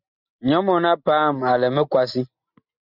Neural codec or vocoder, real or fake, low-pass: none; real; 5.4 kHz